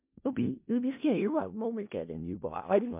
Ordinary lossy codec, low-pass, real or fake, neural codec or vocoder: MP3, 32 kbps; 3.6 kHz; fake; codec, 16 kHz in and 24 kHz out, 0.4 kbps, LongCat-Audio-Codec, four codebook decoder